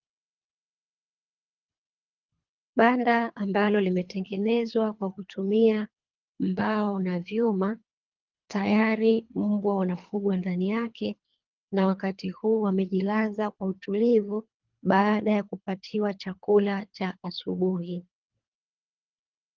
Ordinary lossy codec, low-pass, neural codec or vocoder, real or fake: Opus, 32 kbps; 7.2 kHz; codec, 24 kHz, 3 kbps, HILCodec; fake